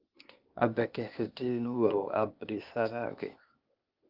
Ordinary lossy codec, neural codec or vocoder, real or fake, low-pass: Opus, 32 kbps; codec, 16 kHz, 0.8 kbps, ZipCodec; fake; 5.4 kHz